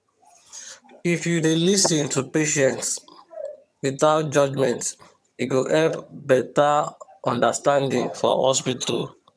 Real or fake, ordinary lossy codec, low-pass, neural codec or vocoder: fake; none; none; vocoder, 22.05 kHz, 80 mel bands, HiFi-GAN